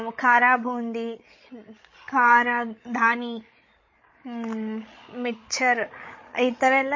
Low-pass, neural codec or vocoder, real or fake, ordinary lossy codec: 7.2 kHz; codec, 16 kHz, 16 kbps, FreqCodec, larger model; fake; MP3, 32 kbps